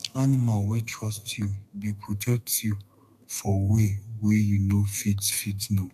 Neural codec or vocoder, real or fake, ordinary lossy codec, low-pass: codec, 32 kHz, 1.9 kbps, SNAC; fake; none; 14.4 kHz